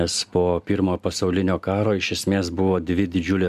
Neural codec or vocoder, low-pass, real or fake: none; 14.4 kHz; real